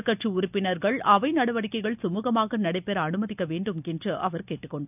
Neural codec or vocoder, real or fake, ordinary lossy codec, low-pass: none; real; none; 3.6 kHz